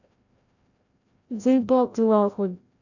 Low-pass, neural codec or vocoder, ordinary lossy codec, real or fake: 7.2 kHz; codec, 16 kHz, 0.5 kbps, FreqCodec, larger model; none; fake